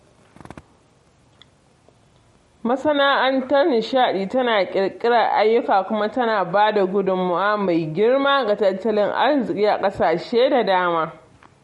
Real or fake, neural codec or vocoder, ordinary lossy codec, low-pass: real; none; MP3, 48 kbps; 19.8 kHz